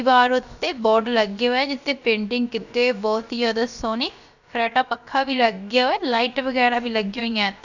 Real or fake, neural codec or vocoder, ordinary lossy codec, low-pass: fake; codec, 16 kHz, about 1 kbps, DyCAST, with the encoder's durations; none; 7.2 kHz